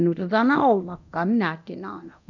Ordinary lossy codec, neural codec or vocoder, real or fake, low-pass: none; codec, 24 kHz, 0.9 kbps, DualCodec; fake; 7.2 kHz